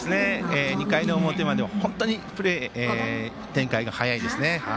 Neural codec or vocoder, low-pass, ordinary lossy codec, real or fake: none; none; none; real